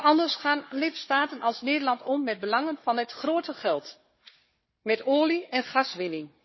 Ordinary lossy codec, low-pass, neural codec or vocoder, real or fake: MP3, 24 kbps; 7.2 kHz; codec, 16 kHz, 4 kbps, FunCodec, trained on Chinese and English, 50 frames a second; fake